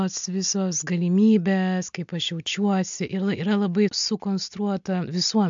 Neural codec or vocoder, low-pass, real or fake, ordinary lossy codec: none; 7.2 kHz; real; MP3, 64 kbps